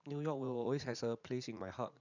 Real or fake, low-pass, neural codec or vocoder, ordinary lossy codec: fake; 7.2 kHz; vocoder, 44.1 kHz, 80 mel bands, Vocos; none